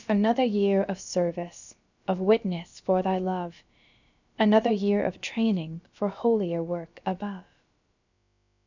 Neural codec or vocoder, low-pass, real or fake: codec, 16 kHz, about 1 kbps, DyCAST, with the encoder's durations; 7.2 kHz; fake